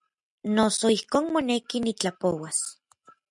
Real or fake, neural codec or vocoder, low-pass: real; none; 10.8 kHz